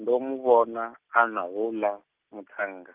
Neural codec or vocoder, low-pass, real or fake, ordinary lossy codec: none; 3.6 kHz; real; Opus, 24 kbps